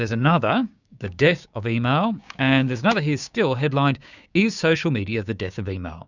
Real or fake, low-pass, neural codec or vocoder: fake; 7.2 kHz; codec, 16 kHz, 6 kbps, DAC